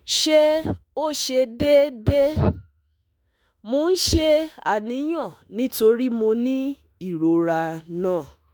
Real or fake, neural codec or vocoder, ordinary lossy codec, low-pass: fake; autoencoder, 48 kHz, 32 numbers a frame, DAC-VAE, trained on Japanese speech; none; none